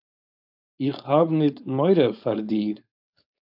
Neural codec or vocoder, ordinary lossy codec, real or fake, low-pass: codec, 16 kHz, 4.8 kbps, FACodec; AAC, 48 kbps; fake; 5.4 kHz